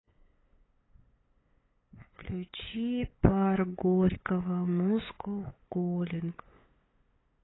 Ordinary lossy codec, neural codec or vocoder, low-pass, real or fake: AAC, 16 kbps; codec, 16 kHz, 8 kbps, FunCodec, trained on LibriTTS, 25 frames a second; 7.2 kHz; fake